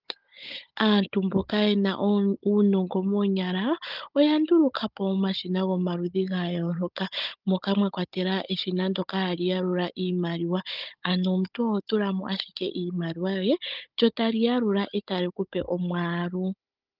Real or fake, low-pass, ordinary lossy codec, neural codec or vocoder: fake; 5.4 kHz; Opus, 24 kbps; codec, 16 kHz, 16 kbps, FunCodec, trained on Chinese and English, 50 frames a second